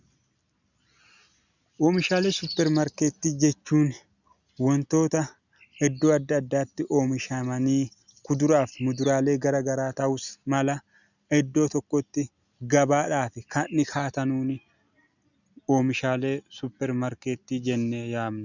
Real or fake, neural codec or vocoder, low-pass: real; none; 7.2 kHz